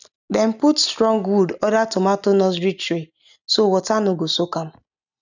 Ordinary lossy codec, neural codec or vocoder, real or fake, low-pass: none; none; real; 7.2 kHz